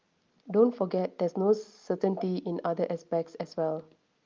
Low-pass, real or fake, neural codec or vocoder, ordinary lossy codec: 7.2 kHz; real; none; Opus, 24 kbps